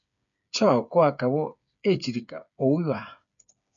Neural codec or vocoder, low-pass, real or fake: codec, 16 kHz, 8 kbps, FreqCodec, smaller model; 7.2 kHz; fake